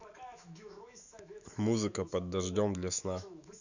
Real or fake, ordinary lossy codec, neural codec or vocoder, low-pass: fake; none; autoencoder, 48 kHz, 128 numbers a frame, DAC-VAE, trained on Japanese speech; 7.2 kHz